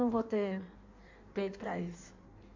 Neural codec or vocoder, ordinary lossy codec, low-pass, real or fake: codec, 16 kHz in and 24 kHz out, 1.1 kbps, FireRedTTS-2 codec; AAC, 48 kbps; 7.2 kHz; fake